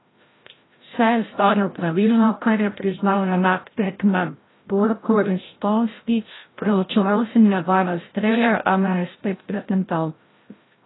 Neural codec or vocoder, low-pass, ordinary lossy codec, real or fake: codec, 16 kHz, 0.5 kbps, FreqCodec, larger model; 7.2 kHz; AAC, 16 kbps; fake